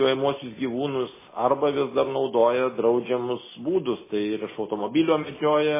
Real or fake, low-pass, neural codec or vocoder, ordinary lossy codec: real; 3.6 kHz; none; MP3, 16 kbps